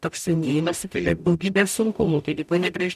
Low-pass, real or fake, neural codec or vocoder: 14.4 kHz; fake; codec, 44.1 kHz, 0.9 kbps, DAC